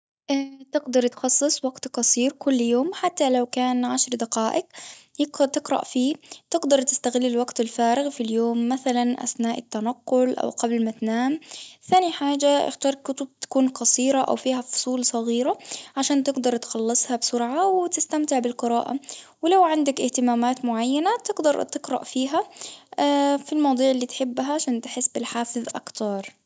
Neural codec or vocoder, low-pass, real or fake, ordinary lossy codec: none; none; real; none